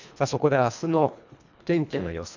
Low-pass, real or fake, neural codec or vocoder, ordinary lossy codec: 7.2 kHz; fake; codec, 24 kHz, 1.5 kbps, HILCodec; none